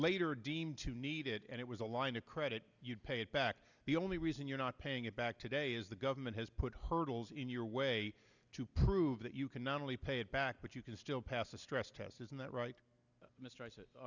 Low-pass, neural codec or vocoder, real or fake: 7.2 kHz; none; real